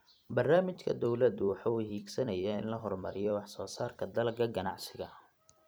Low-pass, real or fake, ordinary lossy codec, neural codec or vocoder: none; fake; none; vocoder, 44.1 kHz, 128 mel bands every 256 samples, BigVGAN v2